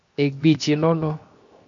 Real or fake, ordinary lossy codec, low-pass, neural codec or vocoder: fake; MP3, 48 kbps; 7.2 kHz; codec, 16 kHz, 0.7 kbps, FocalCodec